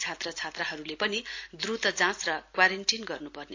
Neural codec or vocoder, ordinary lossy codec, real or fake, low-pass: none; AAC, 48 kbps; real; 7.2 kHz